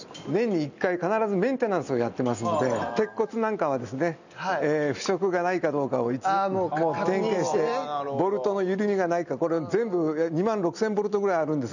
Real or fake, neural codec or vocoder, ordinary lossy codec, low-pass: real; none; none; 7.2 kHz